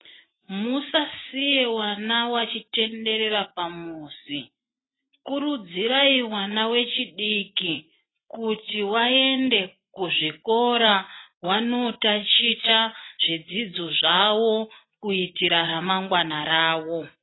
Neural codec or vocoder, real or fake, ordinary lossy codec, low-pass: none; real; AAC, 16 kbps; 7.2 kHz